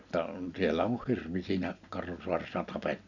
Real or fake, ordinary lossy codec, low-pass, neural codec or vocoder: real; none; 7.2 kHz; none